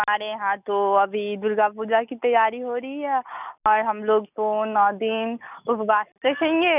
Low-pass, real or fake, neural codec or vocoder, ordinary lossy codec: 3.6 kHz; real; none; none